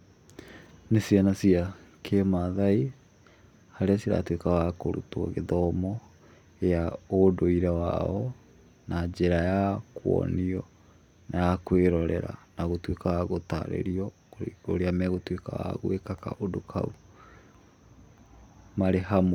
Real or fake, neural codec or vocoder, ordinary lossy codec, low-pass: real; none; none; 19.8 kHz